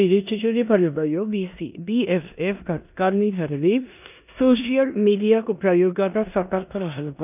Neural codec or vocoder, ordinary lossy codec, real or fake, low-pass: codec, 16 kHz in and 24 kHz out, 0.9 kbps, LongCat-Audio-Codec, four codebook decoder; none; fake; 3.6 kHz